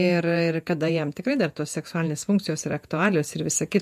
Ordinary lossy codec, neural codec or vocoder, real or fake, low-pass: MP3, 64 kbps; vocoder, 48 kHz, 128 mel bands, Vocos; fake; 14.4 kHz